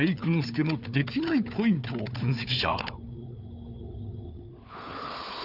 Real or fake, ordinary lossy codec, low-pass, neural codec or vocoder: fake; none; 5.4 kHz; codec, 16 kHz, 4 kbps, FunCodec, trained on Chinese and English, 50 frames a second